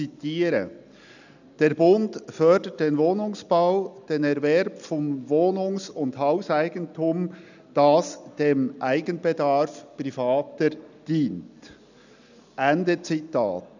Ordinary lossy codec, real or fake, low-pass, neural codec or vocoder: none; real; 7.2 kHz; none